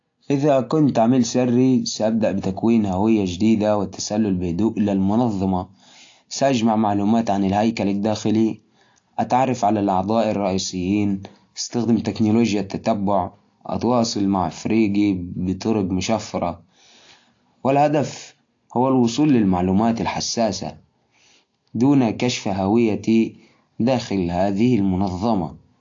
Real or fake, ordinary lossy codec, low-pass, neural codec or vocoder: real; AAC, 48 kbps; 7.2 kHz; none